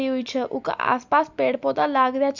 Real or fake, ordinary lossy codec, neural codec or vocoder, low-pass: real; none; none; 7.2 kHz